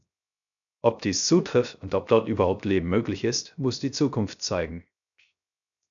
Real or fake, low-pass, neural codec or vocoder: fake; 7.2 kHz; codec, 16 kHz, 0.3 kbps, FocalCodec